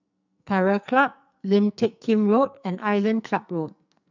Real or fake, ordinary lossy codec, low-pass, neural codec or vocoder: fake; none; 7.2 kHz; codec, 44.1 kHz, 2.6 kbps, SNAC